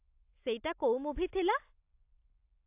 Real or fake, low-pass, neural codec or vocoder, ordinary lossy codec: real; 3.6 kHz; none; AAC, 32 kbps